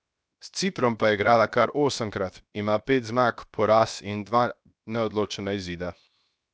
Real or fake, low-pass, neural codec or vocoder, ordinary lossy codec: fake; none; codec, 16 kHz, 0.7 kbps, FocalCodec; none